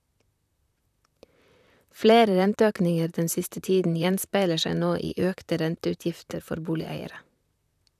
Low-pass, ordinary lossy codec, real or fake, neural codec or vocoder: 14.4 kHz; none; fake; vocoder, 44.1 kHz, 128 mel bands, Pupu-Vocoder